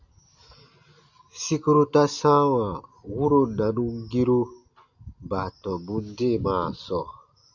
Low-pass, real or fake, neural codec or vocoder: 7.2 kHz; real; none